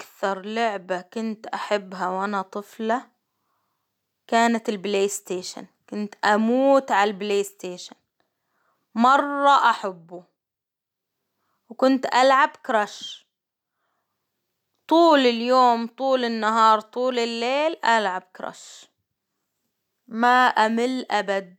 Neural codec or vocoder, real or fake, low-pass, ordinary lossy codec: none; real; 19.8 kHz; none